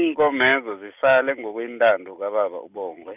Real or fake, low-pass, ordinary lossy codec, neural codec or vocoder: real; 3.6 kHz; none; none